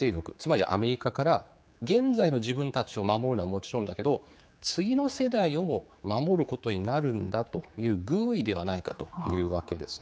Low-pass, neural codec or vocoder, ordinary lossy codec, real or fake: none; codec, 16 kHz, 4 kbps, X-Codec, HuBERT features, trained on general audio; none; fake